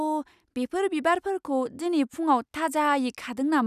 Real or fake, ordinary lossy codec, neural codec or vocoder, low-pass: real; none; none; 14.4 kHz